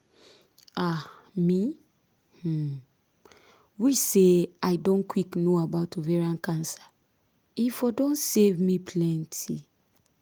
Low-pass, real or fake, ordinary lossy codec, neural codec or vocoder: 19.8 kHz; real; Opus, 32 kbps; none